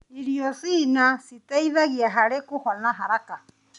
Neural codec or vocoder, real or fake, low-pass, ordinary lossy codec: none; real; 10.8 kHz; none